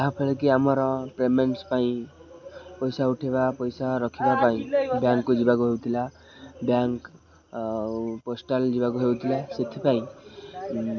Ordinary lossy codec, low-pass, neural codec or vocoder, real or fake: none; 7.2 kHz; none; real